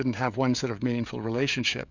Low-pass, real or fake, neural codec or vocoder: 7.2 kHz; fake; codec, 16 kHz, 16 kbps, FreqCodec, smaller model